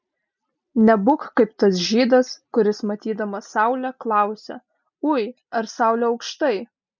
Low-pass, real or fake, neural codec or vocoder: 7.2 kHz; real; none